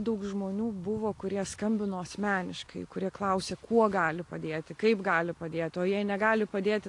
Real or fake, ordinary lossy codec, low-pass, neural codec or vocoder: real; AAC, 48 kbps; 10.8 kHz; none